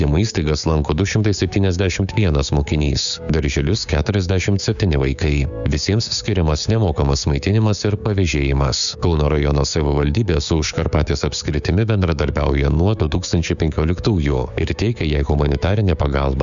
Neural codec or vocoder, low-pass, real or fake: codec, 16 kHz, 6 kbps, DAC; 7.2 kHz; fake